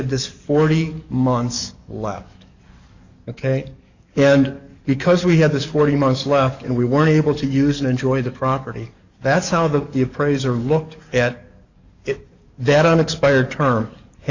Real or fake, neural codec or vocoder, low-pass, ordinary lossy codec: fake; codec, 44.1 kHz, 7.8 kbps, DAC; 7.2 kHz; Opus, 64 kbps